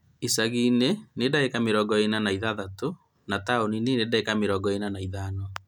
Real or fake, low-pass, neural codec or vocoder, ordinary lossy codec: real; 19.8 kHz; none; none